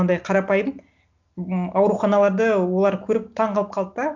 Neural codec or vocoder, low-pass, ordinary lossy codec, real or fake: none; 7.2 kHz; none; real